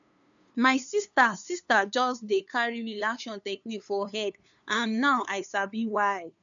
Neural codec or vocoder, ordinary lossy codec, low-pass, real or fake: codec, 16 kHz, 2 kbps, FunCodec, trained on LibriTTS, 25 frames a second; none; 7.2 kHz; fake